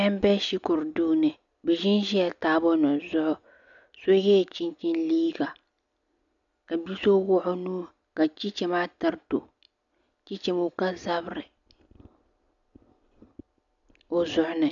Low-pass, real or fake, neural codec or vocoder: 7.2 kHz; real; none